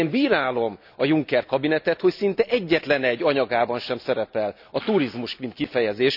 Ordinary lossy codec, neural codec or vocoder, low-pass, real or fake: none; none; 5.4 kHz; real